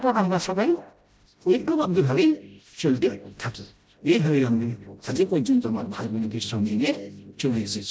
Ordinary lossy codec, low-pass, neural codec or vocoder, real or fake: none; none; codec, 16 kHz, 0.5 kbps, FreqCodec, smaller model; fake